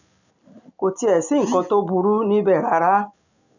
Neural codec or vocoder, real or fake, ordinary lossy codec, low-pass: none; real; none; 7.2 kHz